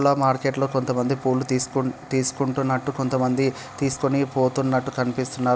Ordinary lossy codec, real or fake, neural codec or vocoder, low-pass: none; real; none; none